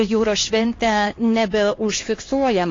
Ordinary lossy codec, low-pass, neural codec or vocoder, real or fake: AAC, 32 kbps; 7.2 kHz; codec, 16 kHz, 2 kbps, X-Codec, HuBERT features, trained on LibriSpeech; fake